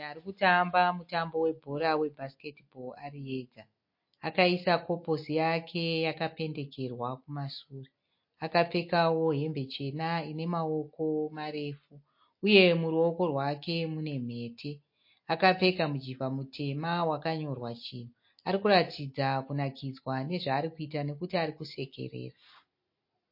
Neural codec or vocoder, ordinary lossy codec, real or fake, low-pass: none; MP3, 32 kbps; real; 5.4 kHz